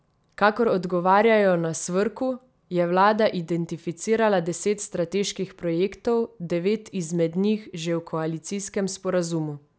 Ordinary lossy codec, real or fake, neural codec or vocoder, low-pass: none; real; none; none